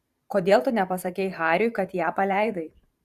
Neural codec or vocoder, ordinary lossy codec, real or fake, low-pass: vocoder, 44.1 kHz, 128 mel bands every 512 samples, BigVGAN v2; Opus, 64 kbps; fake; 14.4 kHz